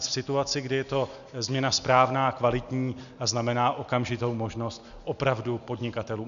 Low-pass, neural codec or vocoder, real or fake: 7.2 kHz; none; real